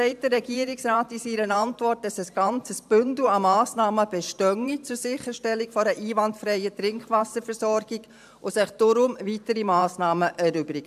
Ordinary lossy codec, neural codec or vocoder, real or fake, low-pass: AAC, 96 kbps; vocoder, 44.1 kHz, 128 mel bands every 512 samples, BigVGAN v2; fake; 14.4 kHz